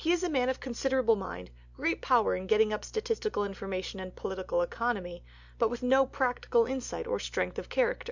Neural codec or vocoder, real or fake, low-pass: none; real; 7.2 kHz